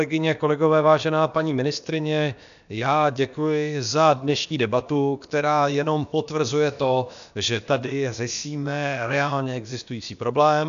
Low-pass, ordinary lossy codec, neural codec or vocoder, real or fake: 7.2 kHz; AAC, 96 kbps; codec, 16 kHz, about 1 kbps, DyCAST, with the encoder's durations; fake